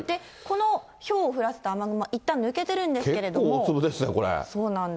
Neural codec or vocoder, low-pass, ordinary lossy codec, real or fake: none; none; none; real